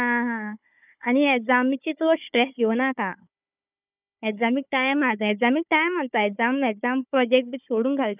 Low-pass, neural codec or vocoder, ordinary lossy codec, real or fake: 3.6 kHz; codec, 16 kHz, 4 kbps, FunCodec, trained on Chinese and English, 50 frames a second; none; fake